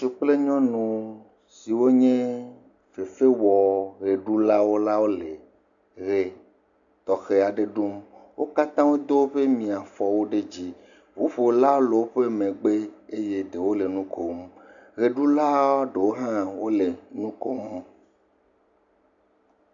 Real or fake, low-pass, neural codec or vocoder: real; 7.2 kHz; none